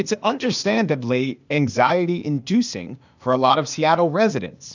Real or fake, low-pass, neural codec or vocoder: fake; 7.2 kHz; codec, 16 kHz, 0.8 kbps, ZipCodec